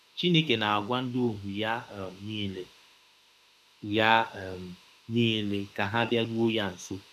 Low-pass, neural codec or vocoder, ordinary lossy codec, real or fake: 14.4 kHz; autoencoder, 48 kHz, 32 numbers a frame, DAC-VAE, trained on Japanese speech; none; fake